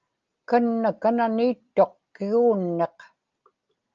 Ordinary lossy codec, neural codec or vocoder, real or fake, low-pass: Opus, 24 kbps; none; real; 7.2 kHz